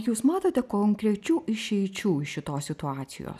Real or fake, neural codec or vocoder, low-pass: real; none; 14.4 kHz